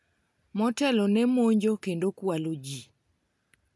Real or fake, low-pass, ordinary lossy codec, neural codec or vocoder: real; none; none; none